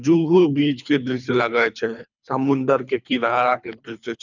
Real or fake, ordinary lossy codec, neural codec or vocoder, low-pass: fake; none; codec, 24 kHz, 3 kbps, HILCodec; 7.2 kHz